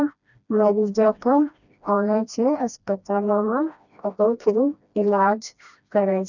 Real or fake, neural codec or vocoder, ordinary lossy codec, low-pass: fake; codec, 16 kHz, 1 kbps, FreqCodec, smaller model; none; 7.2 kHz